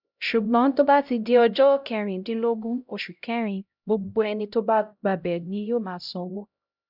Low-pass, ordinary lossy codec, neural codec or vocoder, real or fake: 5.4 kHz; none; codec, 16 kHz, 0.5 kbps, X-Codec, HuBERT features, trained on LibriSpeech; fake